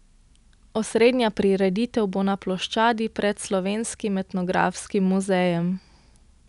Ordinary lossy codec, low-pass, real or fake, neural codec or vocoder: none; 10.8 kHz; real; none